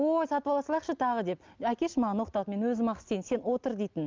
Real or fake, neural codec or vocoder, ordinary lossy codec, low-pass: real; none; Opus, 24 kbps; 7.2 kHz